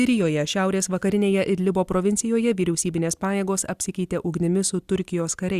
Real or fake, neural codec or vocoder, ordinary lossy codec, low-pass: real; none; Opus, 64 kbps; 14.4 kHz